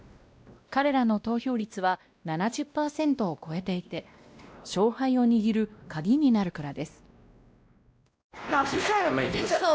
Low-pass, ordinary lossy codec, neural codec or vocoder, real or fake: none; none; codec, 16 kHz, 1 kbps, X-Codec, WavLM features, trained on Multilingual LibriSpeech; fake